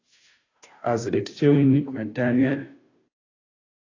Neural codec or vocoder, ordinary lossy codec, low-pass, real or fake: codec, 16 kHz, 0.5 kbps, FunCodec, trained on Chinese and English, 25 frames a second; AAC, 32 kbps; 7.2 kHz; fake